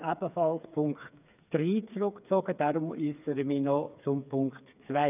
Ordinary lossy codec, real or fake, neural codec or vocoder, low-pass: none; fake; codec, 16 kHz, 4 kbps, FreqCodec, smaller model; 3.6 kHz